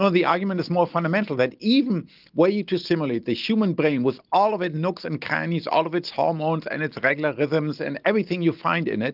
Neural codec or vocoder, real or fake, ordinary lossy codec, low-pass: none; real; Opus, 24 kbps; 5.4 kHz